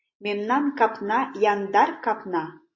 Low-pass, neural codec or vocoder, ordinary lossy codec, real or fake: 7.2 kHz; none; MP3, 32 kbps; real